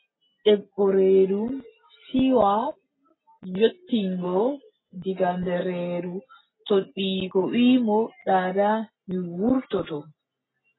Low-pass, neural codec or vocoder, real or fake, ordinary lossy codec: 7.2 kHz; none; real; AAC, 16 kbps